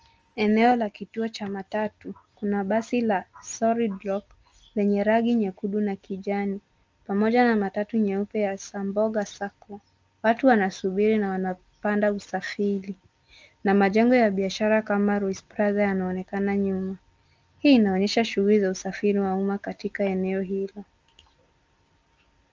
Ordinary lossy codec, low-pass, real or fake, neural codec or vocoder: Opus, 24 kbps; 7.2 kHz; real; none